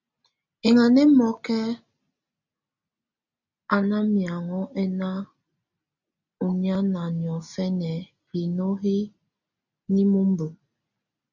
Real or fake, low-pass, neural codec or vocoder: real; 7.2 kHz; none